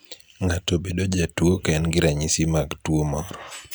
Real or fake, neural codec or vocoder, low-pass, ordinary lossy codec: real; none; none; none